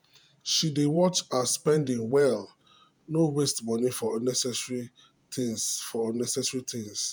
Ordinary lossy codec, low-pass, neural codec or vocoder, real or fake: none; none; vocoder, 48 kHz, 128 mel bands, Vocos; fake